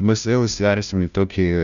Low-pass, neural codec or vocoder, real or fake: 7.2 kHz; codec, 16 kHz, 0.5 kbps, FunCodec, trained on Chinese and English, 25 frames a second; fake